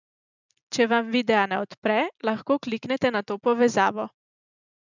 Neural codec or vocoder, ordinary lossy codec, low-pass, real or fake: none; none; 7.2 kHz; real